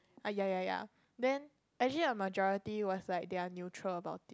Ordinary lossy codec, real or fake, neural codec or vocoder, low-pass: none; real; none; none